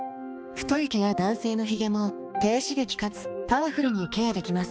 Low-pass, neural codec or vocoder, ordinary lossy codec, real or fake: none; codec, 16 kHz, 2 kbps, X-Codec, HuBERT features, trained on balanced general audio; none; fake